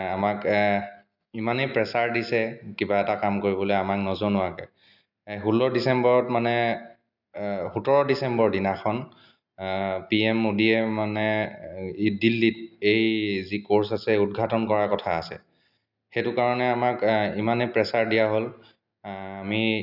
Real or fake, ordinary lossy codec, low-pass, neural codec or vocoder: real; none; 5.4 kHz; none